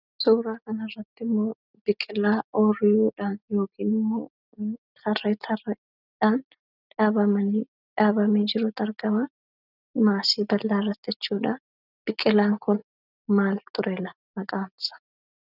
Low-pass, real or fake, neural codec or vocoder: 5.4 kHz; real; none